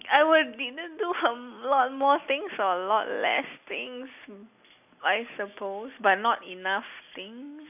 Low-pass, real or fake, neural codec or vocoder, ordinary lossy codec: 3.6 kHz; real; none; none